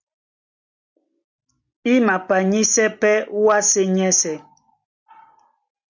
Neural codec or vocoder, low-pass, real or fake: none; 7.2 kHz; real